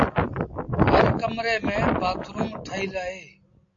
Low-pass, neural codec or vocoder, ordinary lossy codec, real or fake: 7.2 kHz; none; AAC, 48 kbps; real